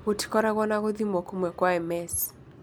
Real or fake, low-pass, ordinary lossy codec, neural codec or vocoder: real; none; none; none